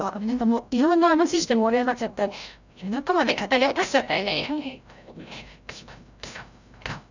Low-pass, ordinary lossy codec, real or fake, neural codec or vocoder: 7.2 kHz; none; fake; codec, 16 kHz, 0.5 kbps, FreqCodec, larger model